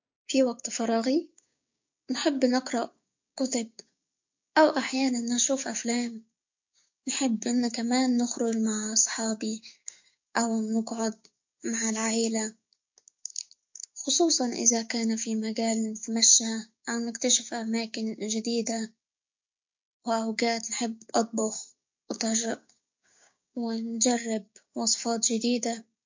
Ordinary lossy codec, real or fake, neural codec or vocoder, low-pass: MP3, 48 kbps; fake; codec, 16 kHz, 6 kbps, DAC; 7.2 kHz